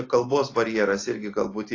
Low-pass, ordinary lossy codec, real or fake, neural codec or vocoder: 7.2 kHz; AAC, 32 kbps; real; none